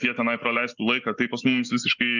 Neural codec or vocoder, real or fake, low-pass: none; real; 7.2 kHz